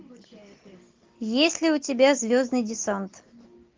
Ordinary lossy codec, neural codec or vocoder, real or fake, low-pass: Opus, 32 kbps; none; real; 7.2 kHz